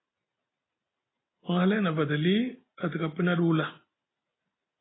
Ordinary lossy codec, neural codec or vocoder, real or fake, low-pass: AAC, 16 kbps; none; real; 7.2 kHz